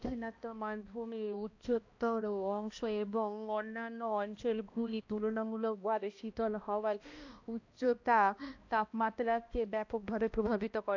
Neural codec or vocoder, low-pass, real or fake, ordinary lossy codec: codec, 16 kHz, 1 kbps, X-Codec, HuBERT features, trained on balanced general audio; 7.2 kHz; fake; AAC, 48 kbps